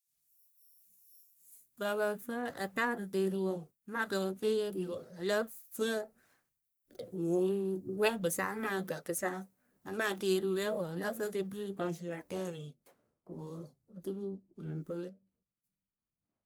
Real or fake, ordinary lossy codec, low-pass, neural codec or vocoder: fake; none; none; codec, 44.1 kHz, 1.7 kbps, Pupu-Codec